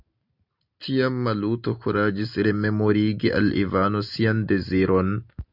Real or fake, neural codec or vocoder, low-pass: real; none; 5.4 kHz